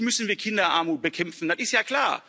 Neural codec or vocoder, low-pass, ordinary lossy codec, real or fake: none; none; none; real